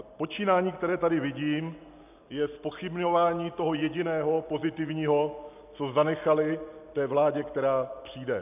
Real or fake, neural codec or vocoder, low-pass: real; none; 3.6 kHz